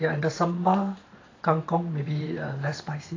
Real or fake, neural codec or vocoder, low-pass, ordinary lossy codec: fake; vocoder, 44.1 kHz, 128 mel bands every 512 samples, BigVGAN v2; 7.2 kHz; AAC, 32 kbps